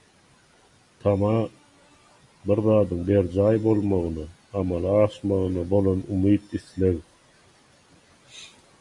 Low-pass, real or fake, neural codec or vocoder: 10.8 kHz; real; none